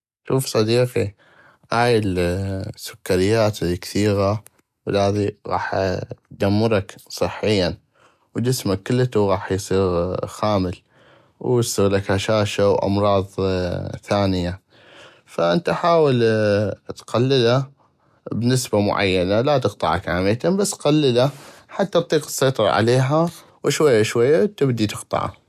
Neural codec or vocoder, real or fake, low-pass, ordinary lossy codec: none; real; 14.4 kHz; none